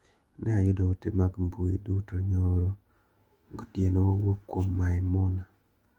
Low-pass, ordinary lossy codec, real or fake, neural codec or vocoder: 19.8 kHz; Opus, 24 kbps; fake; autoencoder, 48 kHz, 128 numbers a frame, DAC-VAE, trained on Japanese speech